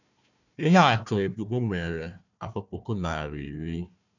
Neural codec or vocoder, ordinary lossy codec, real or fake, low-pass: codec, 16 kHz, 1 kbps, FunCodec, trained on Chinese and English, 50 frames a second; none; fake; 7.2 kHz